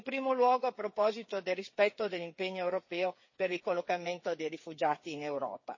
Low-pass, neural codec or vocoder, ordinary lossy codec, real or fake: 7.2 kHz; codec, 16 kHz, 8 kbps, FreqCodec, smaller model; MP3, 32 kbps; fake